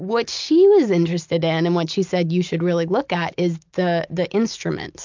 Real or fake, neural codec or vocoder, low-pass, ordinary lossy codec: real; none; 7.2 kHz; MP3, 64 kbps